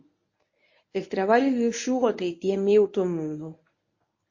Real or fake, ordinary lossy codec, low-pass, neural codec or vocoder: fake; MP3, 32 kbps; 7.2 kHz; codec, 24 kHz, 0.9 kbps, WavTokenizer, medium speech release version 1